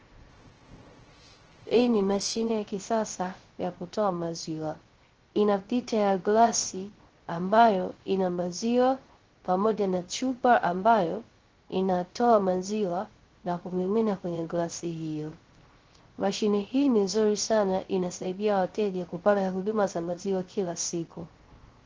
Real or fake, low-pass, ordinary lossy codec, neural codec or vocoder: fake; 7.2 kHz; Opus, 16 kbps; codec, 16 kHz, 0.3 kbps, FocalCodec